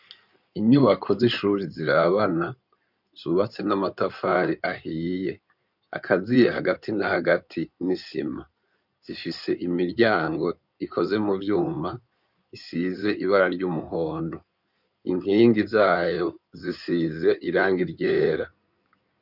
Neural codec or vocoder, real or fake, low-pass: codec, 16 kHz in and 24 kHz out, 2.2 kbps, FireRedTTS-2 codec; fake; 5.4 kHz